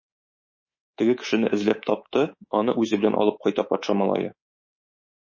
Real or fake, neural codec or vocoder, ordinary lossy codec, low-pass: fake; codec, 24 kHz, 3.1 kbps, DualCodec; MP3, 32 kbps; 7.2 kHz